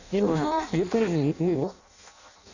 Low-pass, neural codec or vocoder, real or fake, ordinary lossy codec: 7.2 kHz; codec, 16 kHz in and 24 kHz out, 0.6 kbps, FireRedTTS-2 codec; fake; none